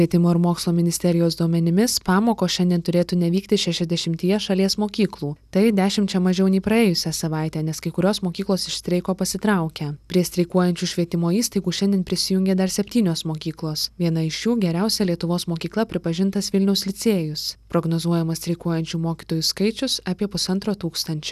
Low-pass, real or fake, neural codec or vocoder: 14.4 kHz; real; none